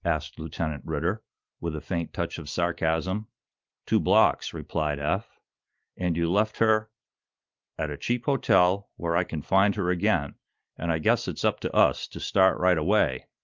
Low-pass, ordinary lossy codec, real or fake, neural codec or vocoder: 7.2 kHz; Opus, 32 kbps; real; none